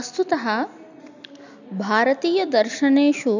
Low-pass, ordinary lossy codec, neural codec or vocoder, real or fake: 7.2 kHz; none; none; real